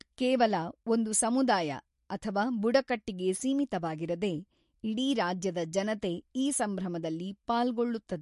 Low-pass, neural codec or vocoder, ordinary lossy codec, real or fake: 14.4 kHz; none; MP3, 48 kbps; real